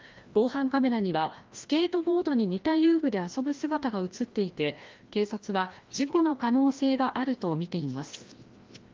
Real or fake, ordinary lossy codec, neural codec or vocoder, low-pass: fake; Opus, 32 kbps; codec, 16 kHz, 1 kbps, FreqCodec, larger model; 7.2 kHz